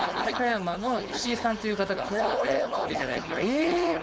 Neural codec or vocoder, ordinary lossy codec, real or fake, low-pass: codec, 16 kHz, 4.8 kbps, FACodec; none; fake; none